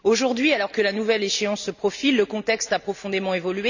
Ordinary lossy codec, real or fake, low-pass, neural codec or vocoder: none; real; 7.2 kHz; none